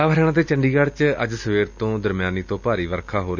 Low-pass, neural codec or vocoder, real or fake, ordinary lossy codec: 7.2 kHz; none; real; none